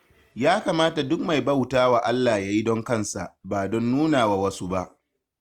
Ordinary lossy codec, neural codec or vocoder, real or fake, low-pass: Opus, 32 kbps; none; real; 19.8 kHz